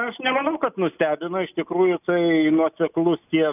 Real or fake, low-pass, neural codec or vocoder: real; 3.6 kHz; none